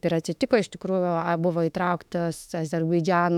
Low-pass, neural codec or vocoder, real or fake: 19.8 kHz; autoencoder, 48 kHz, 32 numbers a frame, DAC-VAE, trained on Japanese speech; fake